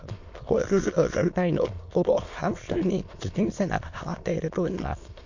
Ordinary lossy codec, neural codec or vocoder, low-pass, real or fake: MP3, 48 kbps; autoencoder, 22.05 kHz, a latent of 192 numbers a frame, VITS, trained on many speakers; 7.2 kHz; fake